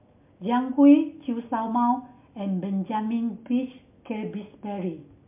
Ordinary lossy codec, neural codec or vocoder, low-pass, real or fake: MP3, 32 kbps; none; 3.6 kHz; real